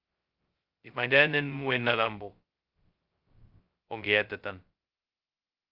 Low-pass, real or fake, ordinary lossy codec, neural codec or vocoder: 5.4 kHz; fake; Opus, 24 kbps; codec, 16 kHz, 0.2 kbps, FocalCodec